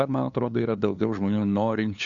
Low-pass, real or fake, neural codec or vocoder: 7.2 kHz; fake; codec, 16 kHz, 2 kbps, FunCodec, trained on LibriTTS, 25 frames a second